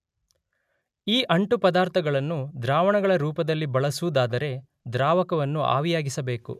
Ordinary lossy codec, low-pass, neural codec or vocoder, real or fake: none; 14.4 kHz; none; real